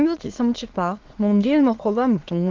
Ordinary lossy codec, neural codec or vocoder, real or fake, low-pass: Opus, 16 kbps; autoencoder, 22.05 kHz, a latent of 192 numbers a frame, VITS, trained on many speakers; fake; 7.2 kHz